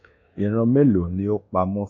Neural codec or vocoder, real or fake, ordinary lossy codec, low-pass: codec, 24 kHz, 1.2 kbps, DualCodec; fake; AAC, 48 kbps; 7.2 kHz